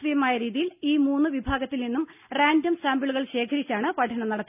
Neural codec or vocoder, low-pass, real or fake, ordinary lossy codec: none; 3.6 kHz; real; none